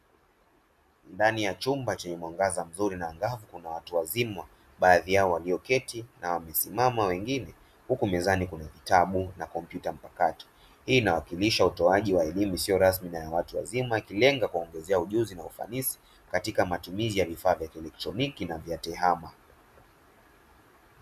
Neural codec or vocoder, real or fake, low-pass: none; real; 14.4 kHz